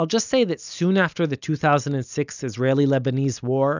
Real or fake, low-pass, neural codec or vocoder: real; 7.2 kHz; none